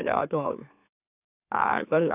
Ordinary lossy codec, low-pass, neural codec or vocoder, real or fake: none; 3.6 kHz; autoencoder, 44.1 kHz, a latent of 192 numbers a frame, MeloTTS; fake